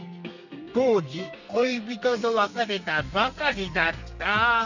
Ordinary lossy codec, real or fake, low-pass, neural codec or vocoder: none; fake; 7.2 kHz; codec, 32 kHz, 1.9 kbps, SNAC